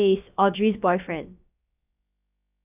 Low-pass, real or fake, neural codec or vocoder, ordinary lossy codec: 3.6 kHz; fake; codec, 16 kHz, about 1 kbps, DyCAST, with the encoder's durations; none